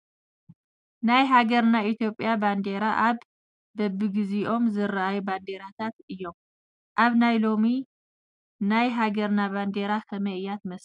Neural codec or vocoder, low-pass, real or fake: none; 10.8 kHz; real